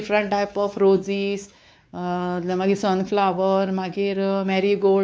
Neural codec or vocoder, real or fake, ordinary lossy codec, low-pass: codec, 16 kHz, 4 kbps, X-Codec, WavLM features, trained on Multilingual LibriSpeech; fake; none; none